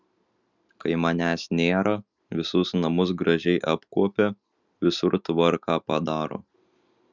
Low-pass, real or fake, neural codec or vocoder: 7.2 kHz; real; none